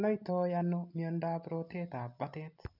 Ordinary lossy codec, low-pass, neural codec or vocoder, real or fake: none; 5.4 kHz; none; real